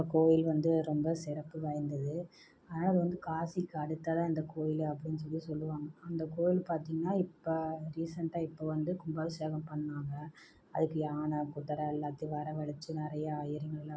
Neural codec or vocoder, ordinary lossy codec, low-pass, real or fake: none; none; none; real